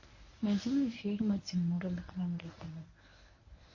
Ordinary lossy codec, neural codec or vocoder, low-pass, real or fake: MP3, 32 kbps; codec, 24 kHz, 0.9 kbps, WavTokenizer, medium speech release version 1; 7.2 kHz; fake